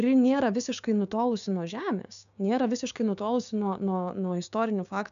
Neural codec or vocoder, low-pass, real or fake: codec, 16 kHz, 6 kbps, DAC; 7.2 kHz; fake